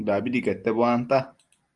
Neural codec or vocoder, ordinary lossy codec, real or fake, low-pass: none; Opus, 32 kbps; real; 10.8 kHz